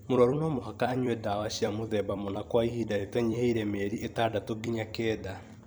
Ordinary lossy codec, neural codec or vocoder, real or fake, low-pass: none; vocoder, 44.1 kHz, 128 mel bands every 256 samples, BigVGAN v2; fake; none